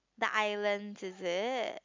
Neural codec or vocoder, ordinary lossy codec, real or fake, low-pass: none; MP3, 64 kbps; real; 7.2 kHz